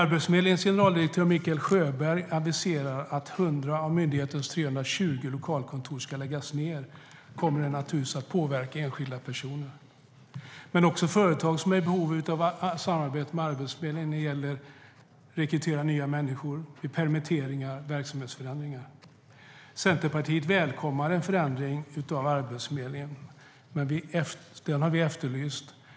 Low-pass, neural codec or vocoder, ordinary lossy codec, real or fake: none; none; none; real